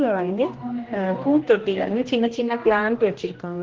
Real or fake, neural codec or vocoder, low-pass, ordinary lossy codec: fake; codec, 16 kHz, 1 kbps, X-Codec, HuBERT features, trained on general audio; 7.2 kHz; Opus, 16 kbps